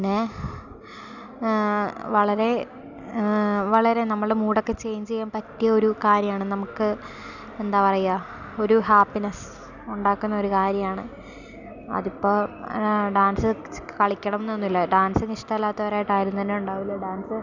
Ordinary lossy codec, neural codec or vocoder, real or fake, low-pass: none; none; real; 7.2 kHz